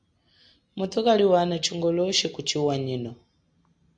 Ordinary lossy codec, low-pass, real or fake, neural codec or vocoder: MP3, 64 kbps; 9.9 kHz; real; none